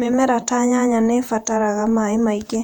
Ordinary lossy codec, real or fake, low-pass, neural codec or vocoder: none; fake; 19.8 kHz; vocoder, 48 kHz, 128 mel bands, Vocos